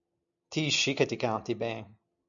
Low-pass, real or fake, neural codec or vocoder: 7.2 kHz; real; none